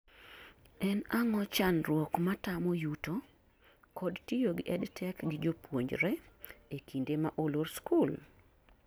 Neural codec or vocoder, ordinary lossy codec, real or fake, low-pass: none; none; real; none